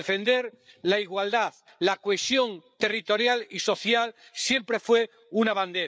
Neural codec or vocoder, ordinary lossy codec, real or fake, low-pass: codec, 16 kHz, 8 kbps, FreqCodec, larger model; none; fake; none